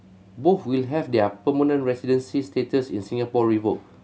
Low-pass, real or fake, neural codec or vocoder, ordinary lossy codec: none; real; none; none